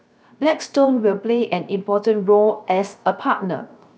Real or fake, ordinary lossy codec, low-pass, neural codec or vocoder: fake; none; none; codec, 16 kHz, 0.7 kbps, FocalCodec